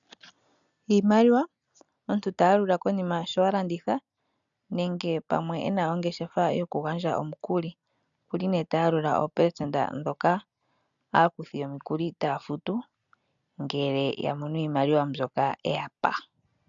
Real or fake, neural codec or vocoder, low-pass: real; none; 7.2 kHz